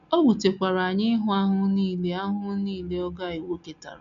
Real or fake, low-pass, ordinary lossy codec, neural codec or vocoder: real; 7.2 kHz; none; none